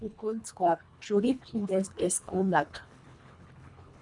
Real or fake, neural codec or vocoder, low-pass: fake; codec, 24 kHz, 1.5 kbps, HILCodec; 10.8 kHz